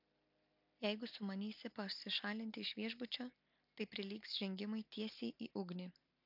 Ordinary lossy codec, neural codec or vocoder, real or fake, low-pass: MP3, 48 kbps; none; real; 5.4 kHz